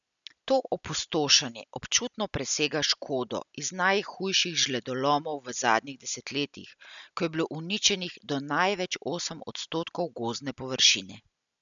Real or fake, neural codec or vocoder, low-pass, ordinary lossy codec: real; none; 7.2 kHz; none